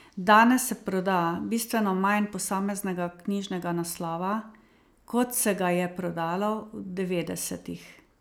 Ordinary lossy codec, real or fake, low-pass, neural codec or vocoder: none; real; none; none